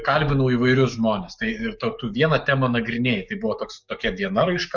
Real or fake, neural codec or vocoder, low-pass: fake; codec, 44.1 kHz, 7.8 kbps, DAC; 7.2 kHz